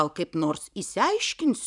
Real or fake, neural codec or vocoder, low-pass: fake; vocoder, 44.1 kHz, 128 mel bands every 512 samples, BigVGAN v2; 10.8 kHz